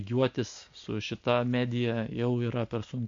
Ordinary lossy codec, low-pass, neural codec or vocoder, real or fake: MP3, 48 kbps; 7.2 kHz; none; real